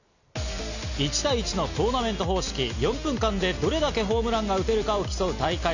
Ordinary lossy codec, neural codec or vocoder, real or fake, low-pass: none; none; real; 7.2 kHz